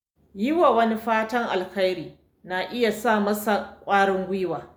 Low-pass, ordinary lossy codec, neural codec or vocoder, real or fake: none; none; none; real